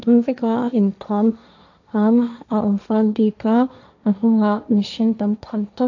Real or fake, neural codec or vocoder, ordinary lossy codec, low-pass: fake; codec, 16 kHz, 1.1 kbps, Voila-Tokenizer; none; 7.2 kHz